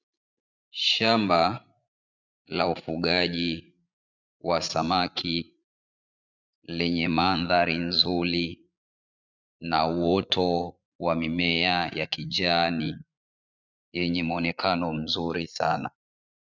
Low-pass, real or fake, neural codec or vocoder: 7.2 kHz; fake; vocoder, 44.1 kHz, 80 mel bands, Vocos